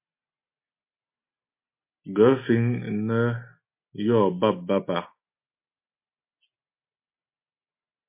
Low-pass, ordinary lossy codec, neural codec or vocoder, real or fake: 3.6 kHz; MP3, 24 kbps; none; real